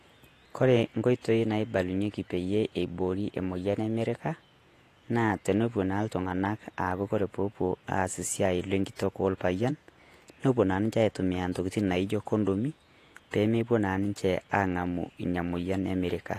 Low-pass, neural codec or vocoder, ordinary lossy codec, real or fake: 14.4 kHz; vocoder, 48 kHz, 128 mel bands, Vocos; AAC, 48 kbps; fake